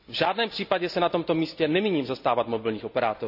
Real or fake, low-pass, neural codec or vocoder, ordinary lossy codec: real; 5.4 kHz; none; none